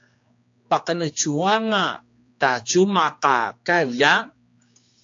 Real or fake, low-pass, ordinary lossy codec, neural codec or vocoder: fake; 7.2 kHz; AAC, 48 kbps; codec, 16 kHz, 2 kbps, X-Codec, HuBERT features, trained on general audio